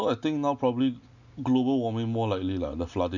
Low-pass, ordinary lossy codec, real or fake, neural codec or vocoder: 7.2 kHz; none; real; none